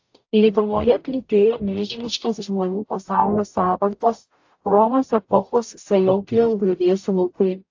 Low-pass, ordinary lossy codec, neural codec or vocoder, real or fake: 7.2 kHz; AAC, 48 kbps; codec, 44.1 kHz, 0.9 kbps, DAC; fake